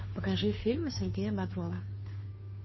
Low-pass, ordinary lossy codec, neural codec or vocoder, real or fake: 7.2 kHz; MP3, 24 kbps; codec, 16 kHz, 2 kbps, FunCodec, trained on Chinese and English, 25 frames a second; fake